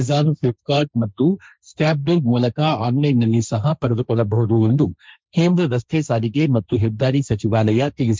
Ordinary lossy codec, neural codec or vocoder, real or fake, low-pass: none; codec, 16 kHz, 1.1 kbps, Voila-Tokenizer; fake; none